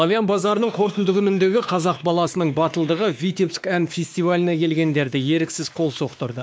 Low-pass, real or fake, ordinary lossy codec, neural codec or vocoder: none; fake; none; codec, 16 kHz, 2 kbps, X-Codec, WavLM features, trained on Multilingual LibriSpeech